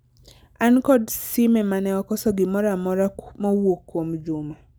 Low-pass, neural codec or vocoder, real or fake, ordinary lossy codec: none; none; real; none